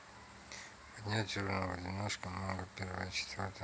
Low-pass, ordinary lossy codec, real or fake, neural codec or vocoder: none; none; real; none